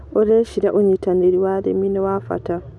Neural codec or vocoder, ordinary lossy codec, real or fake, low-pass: none; none; real; none